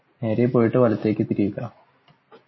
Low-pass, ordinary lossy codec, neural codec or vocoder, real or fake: 7.2 kHz; MP3, 24 kbps; none; real